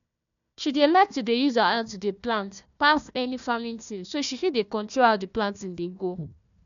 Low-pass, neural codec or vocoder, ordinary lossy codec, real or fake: 7.2 kHz; codec, 16 kHz, 1 kbps, FunCodec, trained on Chinese and English, 50 frames a second; none; fake